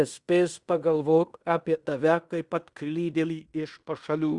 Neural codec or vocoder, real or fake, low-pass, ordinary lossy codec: codec, 16 kHz in and 24 kHz out, 0.9 kbps, LongCat-Audio-Codec, fine tuned four codebook decoder; fake; 10.8 kHz; Opus, 64 kbps